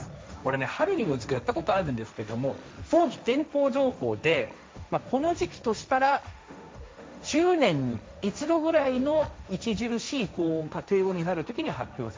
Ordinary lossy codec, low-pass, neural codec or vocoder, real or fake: none; none; codec, 16 kHz, 1.1 kbps, Voila-Tokenizer; fake